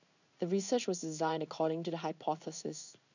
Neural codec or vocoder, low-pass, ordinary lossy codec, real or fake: codec, 16 kHz in and 24 kHz out, 1 kbps, XY-Tokenizer; 7.2 kHz; none; fake